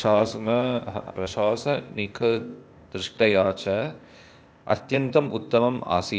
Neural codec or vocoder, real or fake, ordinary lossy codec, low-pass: codec, 16 kHz, 0.8 kbps, ZipCodec; fake; none; none